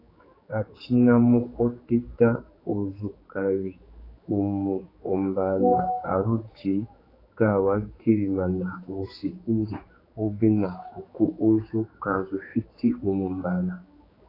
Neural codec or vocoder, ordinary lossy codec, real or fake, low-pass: codec, 16 kHz, 4 kbps, X-Codec, HuBERT features, trained on general audio; AAC, 24 kbps; fake; 5.4 kHz